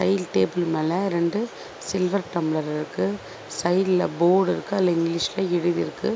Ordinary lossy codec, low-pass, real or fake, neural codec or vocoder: none; none; real; none